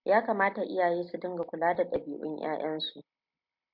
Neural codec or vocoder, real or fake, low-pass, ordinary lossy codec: none; real; 5.4 kHz; MP3, 48 kbps